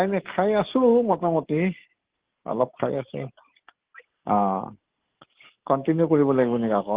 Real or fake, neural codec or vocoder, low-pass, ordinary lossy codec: fake; codec, 44.1 kHz, 7.8 kbps, DAC; 3.6 kHz; Opus, 16 kbps